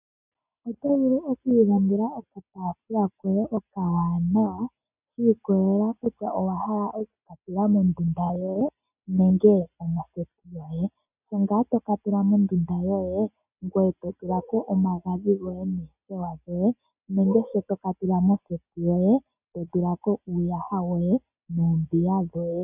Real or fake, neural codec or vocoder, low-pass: real; none; 3.6 kHz